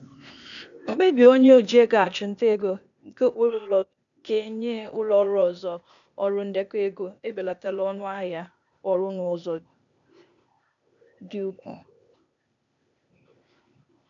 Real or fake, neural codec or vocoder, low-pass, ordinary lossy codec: fake; codec, 16 kHz, 0.8 kbps, ZipCodec; 7.2 kHz; none